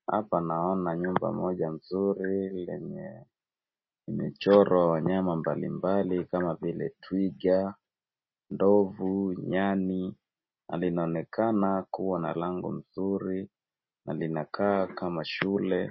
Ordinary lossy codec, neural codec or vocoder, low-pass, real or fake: MP3, 24 kbps; none; 7.2 kHz; real